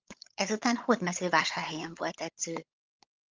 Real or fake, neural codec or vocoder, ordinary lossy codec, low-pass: fake; codec, 16 kHz, 16 kbps, FunCodec, trained on LibriTTS, 50 frames a second; Opus, 24 kbps; 7.2 kHz